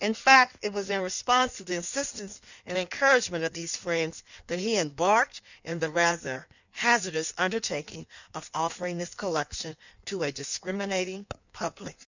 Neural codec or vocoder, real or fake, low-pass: codec, 16 kHz in and 24 kHz out, 1.1 kbps, FireRedTTS-2 codec; fake; 7.2 kHz